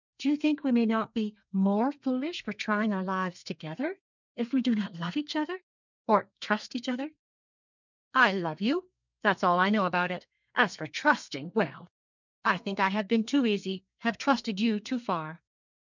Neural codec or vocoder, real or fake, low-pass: codec, 32 kHz, 1.9 kbps, SNAC; fake; 7.2 kHz